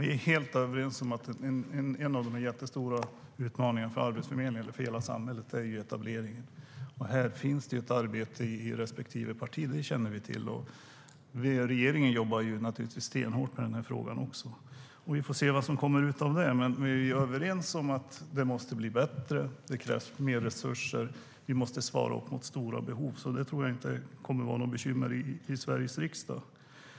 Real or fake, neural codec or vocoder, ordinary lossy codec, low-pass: real; none; none; none